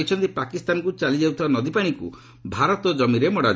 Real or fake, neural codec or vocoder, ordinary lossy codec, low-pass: real; none; none; none